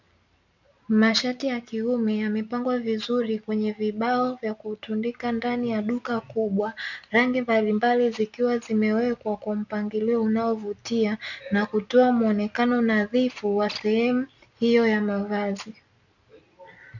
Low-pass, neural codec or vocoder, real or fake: 7.2 kHz; none; real